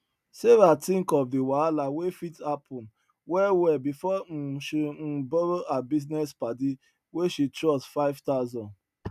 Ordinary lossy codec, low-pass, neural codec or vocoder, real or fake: none; 14.4 kHz; none; real